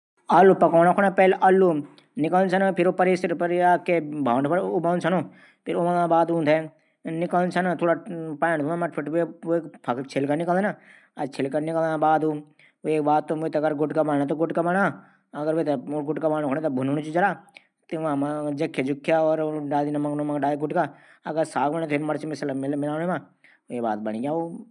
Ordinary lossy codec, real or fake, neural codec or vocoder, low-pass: none; real; none; 10.8 kHz